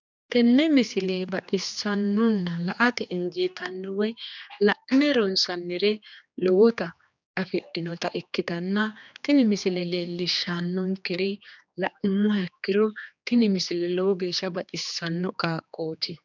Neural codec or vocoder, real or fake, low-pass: codec, 16 kHz, 2 kbps, X-Codec, HuBERT features, trained on general audio; fake; 7.2 kHz